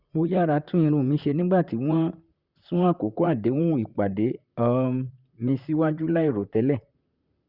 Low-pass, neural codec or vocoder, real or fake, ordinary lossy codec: 5.4 kHz; vocoder, 44.1 kHz, 128 mel bands, Pupu-Vocoder; fake; Opus, 64 kbps